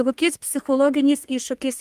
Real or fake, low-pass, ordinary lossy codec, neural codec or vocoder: fake; 14.4 kHz; Opus, 32 kbps; codec, 32 kHz, 1.9 kbps, SNAC